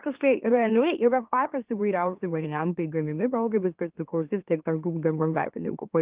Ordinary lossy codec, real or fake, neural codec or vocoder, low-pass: Opus, 32 kbps; fake; autoencoder, 44.1 kHz, a latent of 192 numbers a frame, MeloTTS; 3.6 kHz